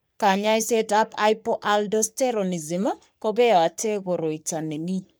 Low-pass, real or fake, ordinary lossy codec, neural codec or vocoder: none; fake; none; codec, 44.1 kHz, 3.4 kbps, Pupu-Codec